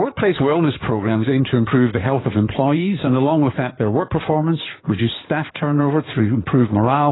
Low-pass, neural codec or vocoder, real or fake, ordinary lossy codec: 7.2 kHz; codec, 16 kHz in and 24 kHz out, 2.2 kbps, FireRedTTS-2 codec; fake; AAC, 16 kbps